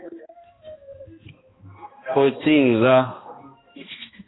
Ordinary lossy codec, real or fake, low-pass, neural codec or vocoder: AAC, 16 kbps; fake; 7.2 kHz; codec, 16 kHz, 1 kbps, X-Codec, HuBERT features, trained on balanced general audio